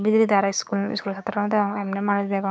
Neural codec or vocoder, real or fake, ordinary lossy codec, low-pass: codec, 16 kHz, 6 kbps, DAC; fake; none; none